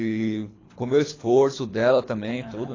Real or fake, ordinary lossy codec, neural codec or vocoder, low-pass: fake; AAC, 32 kbps; codec, 24 kHz, 3 kbps, HILCodec; 7.2 kHz